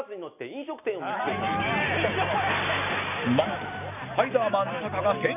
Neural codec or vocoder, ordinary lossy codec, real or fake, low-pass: none; none; real; 3.6 kHz